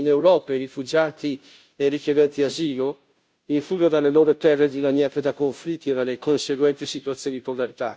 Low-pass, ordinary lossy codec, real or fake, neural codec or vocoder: none; none; fake; codec, 16 kHz, 0.5 kbps, FunCodec, trained on Chinese and English, 25 frames a second